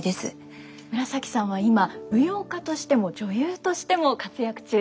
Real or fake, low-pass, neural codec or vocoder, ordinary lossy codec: real; none; none; none